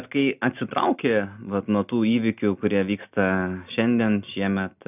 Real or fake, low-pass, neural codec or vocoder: real; 3.6 kHz; none